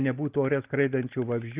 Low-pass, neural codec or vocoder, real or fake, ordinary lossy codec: 3.6 kHz; none; real; Opus, 32 kbps